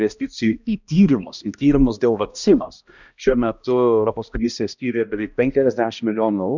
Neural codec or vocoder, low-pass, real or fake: codec, 16 kHz, 1 kbps, X-Codec, HuBERT features, trained on balanced general audio; 7.2 kHz; fake